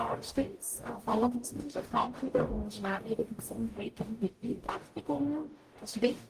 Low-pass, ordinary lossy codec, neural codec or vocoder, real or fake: 14.4 kHz; Opus, 24 kbps; codec, 44.1 kHz, 0.9 kbps, DAC; fake